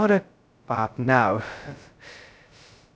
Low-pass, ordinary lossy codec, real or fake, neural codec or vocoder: none; none; fake; codec, 16 kHz, 0.2 kbps, FocalCodec